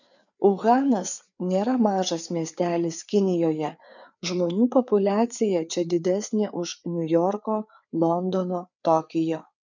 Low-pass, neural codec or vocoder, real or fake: 7.2 kHz; codec, 16 kHz, 4 kbps, FreqCodec, larger model; fake